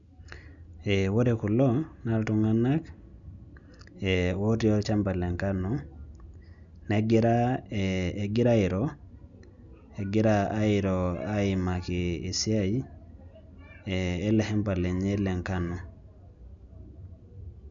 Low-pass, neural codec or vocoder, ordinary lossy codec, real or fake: 7.2 kHz; none; none; real